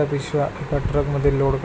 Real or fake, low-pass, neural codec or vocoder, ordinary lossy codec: real; none; none; none